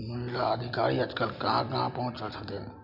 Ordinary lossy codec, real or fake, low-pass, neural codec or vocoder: none; real; 5.4 kHz; none